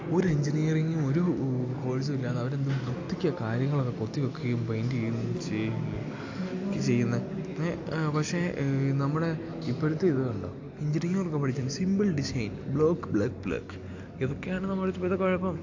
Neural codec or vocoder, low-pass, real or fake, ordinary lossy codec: none; 7.2 kHz; real; AAC, 48 kbps